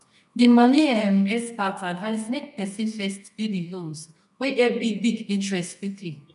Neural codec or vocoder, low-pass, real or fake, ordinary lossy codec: codec, 24 kHz, 0.9 kbps, WavTokenizer, medium music audio release; 10.8 kHz; fake; none